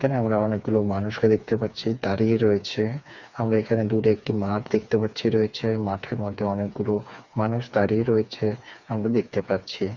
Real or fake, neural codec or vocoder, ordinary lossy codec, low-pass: fake; codec, 16 kHz, 4 kbps, FreqCodec, smaller model; none; 7.2 kHz